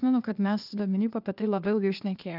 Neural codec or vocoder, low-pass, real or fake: codec, 16 kHz, 0.8 kbps, ZipCodec; 5.4 kHz; fake